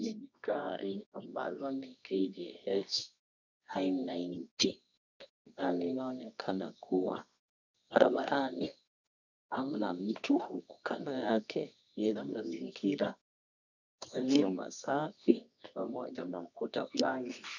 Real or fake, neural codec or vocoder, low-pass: fake; codec, 24 kHz, 0.9 kbps, WavTokenizer, medium music audio release; 7.2 kHz